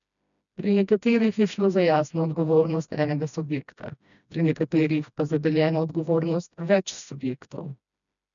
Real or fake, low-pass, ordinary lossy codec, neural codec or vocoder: fake; 7.2 kHz; none; codec, 16 kHz, 1 kbps, FreqCodec, smaller model